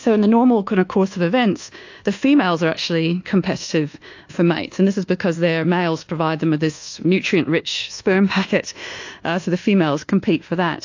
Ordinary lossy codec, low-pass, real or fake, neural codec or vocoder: AAC, 48 kbps; 7.2 kHz; fake; codec, 24 kHz, 1.2 kbps, DualCodec